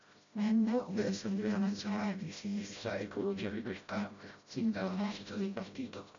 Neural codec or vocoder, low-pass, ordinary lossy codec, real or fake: codec, 16 kHz, 0.5 kbps, FreqCodec, smaller model; 7.2 kHz; MP3, 64 kbps; fake